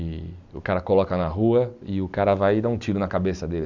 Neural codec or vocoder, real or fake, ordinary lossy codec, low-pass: none; real; Opus, 64 kbps; 7.2 kHz